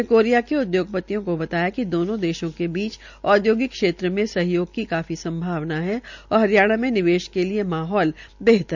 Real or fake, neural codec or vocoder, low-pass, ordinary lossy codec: real; none; 7.2 kHz; none